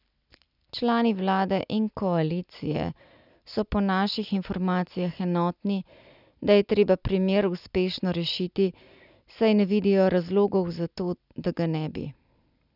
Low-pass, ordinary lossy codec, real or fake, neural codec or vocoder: 5.4 kHz; none; real; none